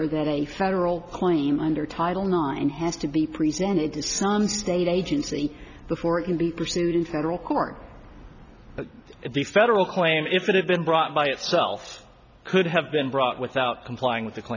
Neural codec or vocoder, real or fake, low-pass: none; real; 7.2 kHz